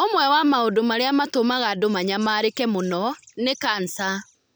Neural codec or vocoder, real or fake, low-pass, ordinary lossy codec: none; real; none; none